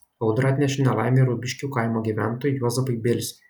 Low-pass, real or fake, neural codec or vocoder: 19.8 kHz; real; none